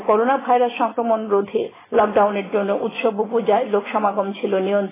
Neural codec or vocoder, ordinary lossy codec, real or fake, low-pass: none; AAC, 16 kbps; real; 3.6 kHz